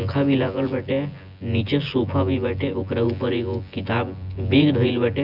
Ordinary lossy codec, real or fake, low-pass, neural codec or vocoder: none; fake; 5.4 kHz; vocoder, 24 kHz, 100 mel bands, Vocos